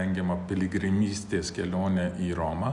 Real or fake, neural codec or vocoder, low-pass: real; none; 10.8 kHz